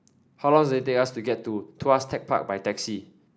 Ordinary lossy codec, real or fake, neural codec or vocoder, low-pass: none; real; none; none